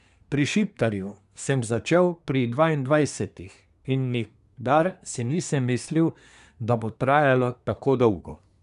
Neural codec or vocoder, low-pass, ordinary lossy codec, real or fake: codec, 24 kHz, 1 kbps, SNAC; 10.8 kHz; none; fake